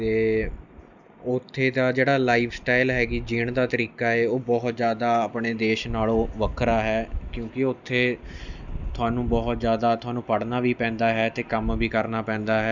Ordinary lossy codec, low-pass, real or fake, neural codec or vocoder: none; 7.2 kHz; real; none